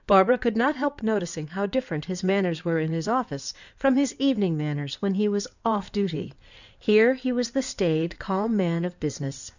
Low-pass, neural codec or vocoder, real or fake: 7.2 kHz; codec, 16 kHz in and 24 kHz out, 2.2 kbps, FireRedTTS-2 codec; fake